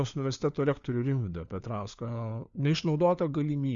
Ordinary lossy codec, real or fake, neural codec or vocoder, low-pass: Opus, 64 kbps; fake; codec, 16 kHz, 4 kbps, FunCodec, trained on LibriTTS, 50 frames a second; 7.2 kHz